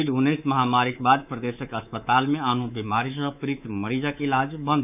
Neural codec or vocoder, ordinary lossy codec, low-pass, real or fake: codec, 24 kHz, 3.1 kbps, DualCodec; none; 3.6 kHz; fake